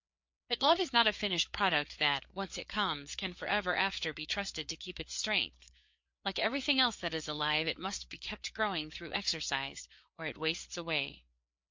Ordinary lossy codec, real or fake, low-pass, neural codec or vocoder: MP3, 64 kbps; fake; 7.2 kHz; codec, 16 kHz, 4 kbps, FreqCodec, larger model